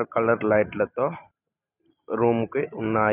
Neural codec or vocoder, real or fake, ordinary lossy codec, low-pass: vocoder, 44.1 kHz, 128 mel bands every 512 samples, BigVGAN v2; fake; none; 3.6 kHz